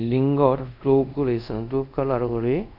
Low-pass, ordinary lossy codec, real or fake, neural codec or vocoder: 5.4 kHz; none; fake; codec, 24 kHz, 0.5 kbps, DualCodec